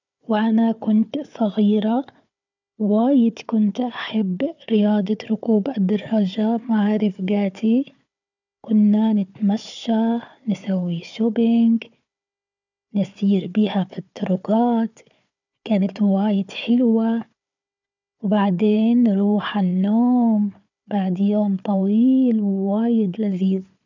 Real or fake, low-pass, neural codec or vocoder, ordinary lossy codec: fake; 7.2 kHz; codec, 16 kHz, 4 kbps, FunCodec, trained on Chinese and English, 50 frames a second; none